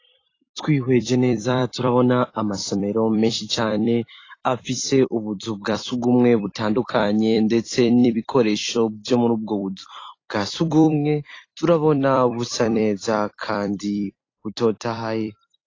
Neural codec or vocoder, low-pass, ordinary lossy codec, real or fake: vocoder, 44.1 kHz, 128 mel bands every 256 samples, BigVGAN v2; 7.2 kHz; AAC, 32 kbps; fake